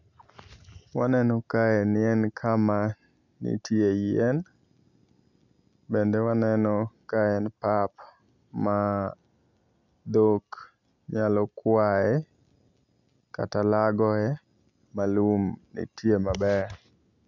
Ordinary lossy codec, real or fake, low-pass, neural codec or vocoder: none; real; 7.2 kHz; none